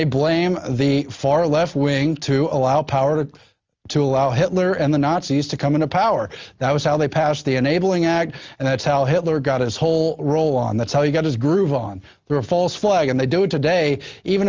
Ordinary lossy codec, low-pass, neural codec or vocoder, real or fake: Opus, 32 kbps; 7.2 kHz; none; real